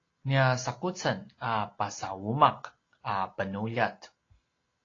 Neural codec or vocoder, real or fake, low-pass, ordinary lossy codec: none; real; 7.2 kHz; AAC, 32 kbps